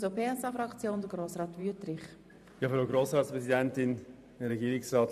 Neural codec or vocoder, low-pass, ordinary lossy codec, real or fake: vocoder, 44.1 kHz, 128 mel bands every 512 samples, BigVGAN v2; 14.4 kHz; none; fake